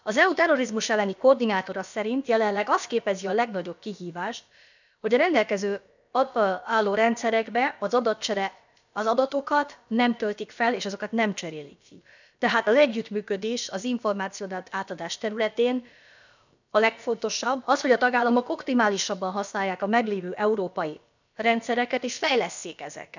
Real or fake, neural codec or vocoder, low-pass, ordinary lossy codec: fake; codec, 16 kHz, about 1 kbps, DyCAST, with the encoder's durations; 7.2 kHz; none